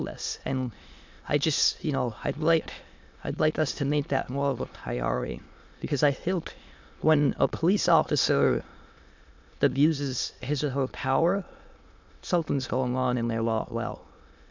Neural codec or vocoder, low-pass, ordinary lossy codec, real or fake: autoencoder, 22.05 kHz, a latent of 192 numbers a frame, VITS, trained on many speakers; 7.2 kHz; MP3, 64 kbps; fake